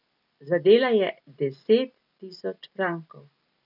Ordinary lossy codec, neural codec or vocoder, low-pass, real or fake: none; none; 5.4 kHz; real